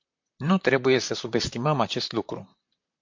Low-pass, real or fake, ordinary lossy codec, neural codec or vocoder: 7.2 kHz; fake; MP3, 48 kbps; vocoder, 44.1 kHz, 128 mel bands, Pupu-Vocoder